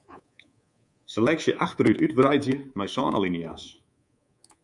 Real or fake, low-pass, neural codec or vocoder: fake; 10.8 kHz; codec, 24 kHz, 3.1 kbps, DualCodec